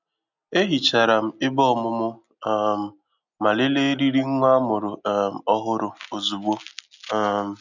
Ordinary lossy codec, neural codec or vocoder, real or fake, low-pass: none; none; real; 7.2 kHz